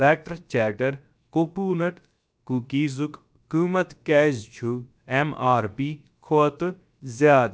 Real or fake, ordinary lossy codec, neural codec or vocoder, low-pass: fake; none; codec, 16 kHz, about 1 kbps, DyCAST, with the encoder's durations; none